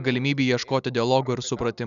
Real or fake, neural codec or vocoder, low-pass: real; none; 7.2 kHz